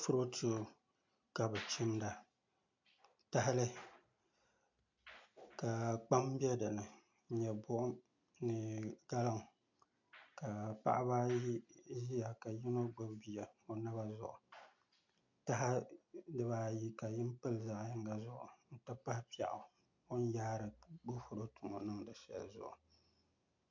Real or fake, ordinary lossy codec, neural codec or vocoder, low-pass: real; MP3, 48 kbps; none; 7.2 kHz